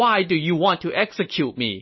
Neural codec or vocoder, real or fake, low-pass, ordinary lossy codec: none; real; 7.2 kHz; MP3, 24 kbps